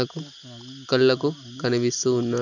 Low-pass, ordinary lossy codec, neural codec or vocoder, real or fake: 7.2 kHz; none; none; real